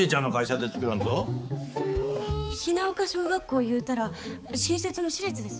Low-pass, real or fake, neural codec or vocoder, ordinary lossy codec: none; fake; codec, 16 kHz, 4 kbps, X-Codec, HuBERT features, trained on balanced general audio; none